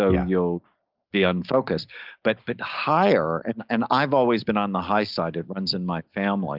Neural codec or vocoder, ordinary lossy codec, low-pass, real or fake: none; Opus, 32 kbps; 5.4 kHz; real